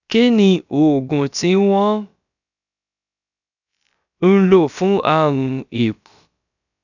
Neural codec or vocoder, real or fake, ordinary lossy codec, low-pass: codec, 16 kHz, about 1 kbps, DyCAST, with the encoder's durations; fake; none; 7.2 kHz